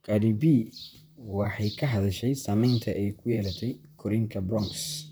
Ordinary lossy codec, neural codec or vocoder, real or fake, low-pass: none; vocoder, 44.1 kHz, 128 mel bands, Pupu-Vocoder; fake; none